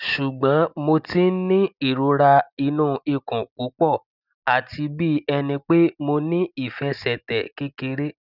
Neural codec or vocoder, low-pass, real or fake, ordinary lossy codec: none; 5.4 kHz; real; none